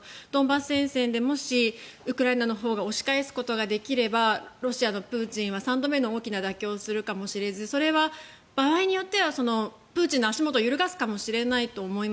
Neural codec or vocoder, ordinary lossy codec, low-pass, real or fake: none; none; none; real